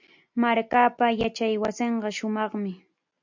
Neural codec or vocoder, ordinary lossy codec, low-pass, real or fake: none; MP3, 64 kbps; 7.2 kHz; real